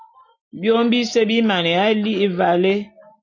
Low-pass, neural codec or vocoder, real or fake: 7.2 kHz; none; real